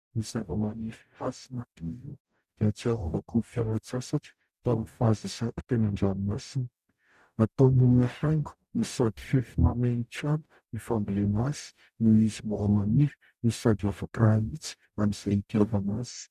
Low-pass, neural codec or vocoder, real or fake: 14.4 kHz; codec, 44.1 kHz, 0.9 kbps, DAC; fake